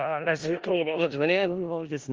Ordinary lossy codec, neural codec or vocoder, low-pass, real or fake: Opus, 24 kbps; codec, 16 kHz in and 24 kHz out, 0.4 kbps, LongCat-Audio-Codec, four codebook decoder; 7.2 kHz; fake